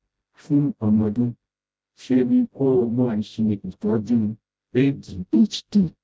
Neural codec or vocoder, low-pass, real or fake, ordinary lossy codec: codec, 16 kHz, 0.5 kbps, FreqCodec, smaller model; none; fake; none